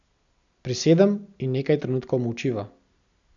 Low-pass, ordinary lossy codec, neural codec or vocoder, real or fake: 7.2 kHz; none; none; real